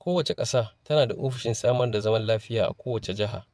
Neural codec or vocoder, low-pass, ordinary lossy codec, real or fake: vocoder, 22.05 kHz, 80 mel bands, WaveNeXt; none; none; fake